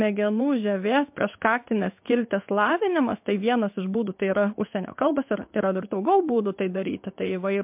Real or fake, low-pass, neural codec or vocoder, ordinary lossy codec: fake; 3.6 kHz; codec, 16 kHz in and 24 kHz out, 1 kbps, XY-Tokenizer; MP3, 32 kbps